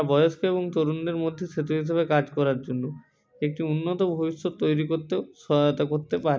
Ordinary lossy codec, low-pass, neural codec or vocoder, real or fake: none; none; none; real